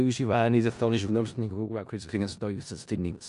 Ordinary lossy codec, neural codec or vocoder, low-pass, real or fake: AAC, 96 kbps; codec, 16 kHz in and 24 kHz out, 0.4 kbps, LongCat-Audio-Codec, four codebook decoder; 10.8 kHz; fake